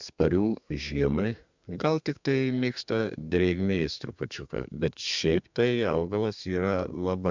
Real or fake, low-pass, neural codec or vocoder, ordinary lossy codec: fake; 7.2 kHz; codec, 32 kHz, 1.9 kbps, SNAC; MP3, 64 kbps